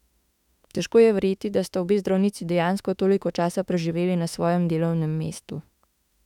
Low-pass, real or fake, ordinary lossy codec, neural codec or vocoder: 19.8 kHz; fake; none; autoencoder, 48 kHz, 32 numbers a frame, DAC-VAE, trained on Japanese speech